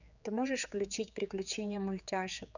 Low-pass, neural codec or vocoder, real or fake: 7.2 kHz; codec, 16 kHz, 4 kbps, X-Codec, HuBERT features, trained on general audio; fake